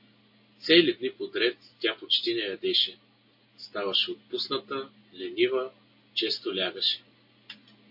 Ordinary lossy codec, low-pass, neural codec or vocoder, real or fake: MP3, 32 kbps; 5.4 kHz; none; real